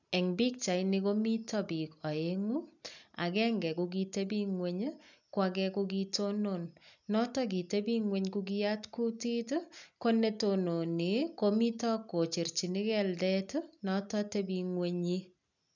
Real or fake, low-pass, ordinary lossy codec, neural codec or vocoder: real; 7.2 kHz; none; none